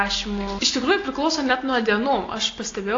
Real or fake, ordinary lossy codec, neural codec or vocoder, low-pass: real; AAC, 32 kbps; none; 7.2 kHz